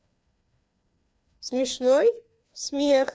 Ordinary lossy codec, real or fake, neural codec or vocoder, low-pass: none; fake; codec, 16 kHz, 2 kbps, FreqCodec, larger model; none